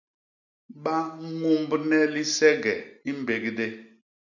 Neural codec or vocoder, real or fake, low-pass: none; real; 7.2 kHz